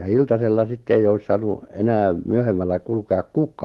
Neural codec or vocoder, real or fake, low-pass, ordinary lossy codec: autoencoder, 48 kHz, 128 numbers a frame, DAC-VAE, trained on Japanese speech; fake; 19.8 kHz; Opus, 16 kbps